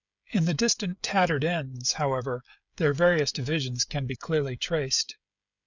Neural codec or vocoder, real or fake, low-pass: codec, 16 kHz, 16 kbps, FreqCodec, smaller model; fake; 7.2 kHz